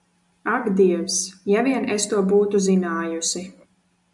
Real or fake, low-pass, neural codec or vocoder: real; 10.8 kHz; none